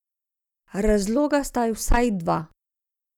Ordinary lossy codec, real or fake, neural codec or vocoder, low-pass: none; real; none; 19.8 kHz